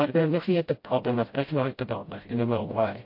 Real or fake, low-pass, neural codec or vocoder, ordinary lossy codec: fake; 5.4 kHz; codec, 16 kHz, 0.5 kbps, FreqCodec, smaller model; MP3, 32 kbps